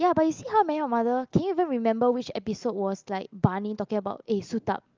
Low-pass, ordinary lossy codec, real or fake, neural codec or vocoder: 7.2 kHz; Opus, 32 kbps; real; none